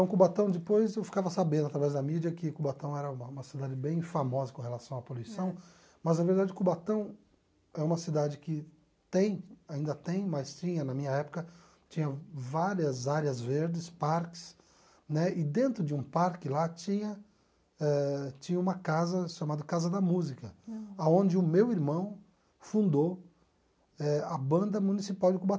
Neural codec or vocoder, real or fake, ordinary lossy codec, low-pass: none; real; none; none